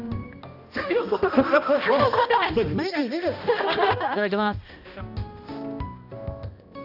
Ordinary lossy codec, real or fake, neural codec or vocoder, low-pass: none; fake; codec, 16 kHz, 1 kbps, X-Codec, HuBERT features, trained on balanced general audio; 5.4 kHz